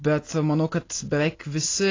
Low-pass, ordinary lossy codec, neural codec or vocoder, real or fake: 7.2 kHz; AAC, 32 kbps; none; real